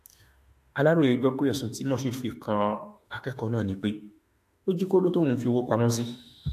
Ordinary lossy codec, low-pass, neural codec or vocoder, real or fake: AAC, 64 kbps; 14.4 kHz; autoencoder, 48 kHz, 32 numbers a frame, DAC-VAE, trained on Japanese speech; fake